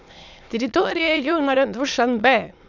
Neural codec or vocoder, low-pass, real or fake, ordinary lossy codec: autoencoder, 22.05 kHz, a latent of 192 numbers a frame, VITS, trained on many speakers; 7.2 kHz; fake; none